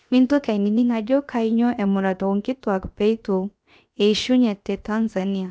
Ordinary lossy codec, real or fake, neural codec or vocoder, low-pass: none; fake; codec, 16 kHz, 0.7 kbps, FocalCodec; none